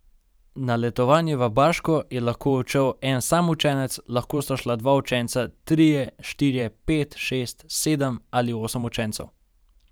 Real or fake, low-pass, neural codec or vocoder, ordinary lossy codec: fake; none; vocoder, 44.1 kHz, 128 mel bands every 512 samples, BigVGAN v2; none